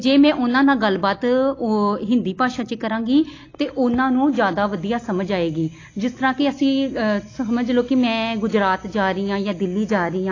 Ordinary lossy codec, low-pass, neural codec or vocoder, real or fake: AAC, 32 kbps; 7.2 kHz; none; real